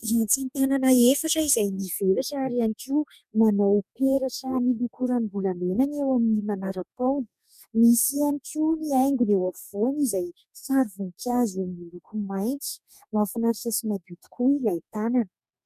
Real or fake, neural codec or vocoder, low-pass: fake; codec, 44.1 kHz, 2.6 kbps, DAC; 14.4 kHz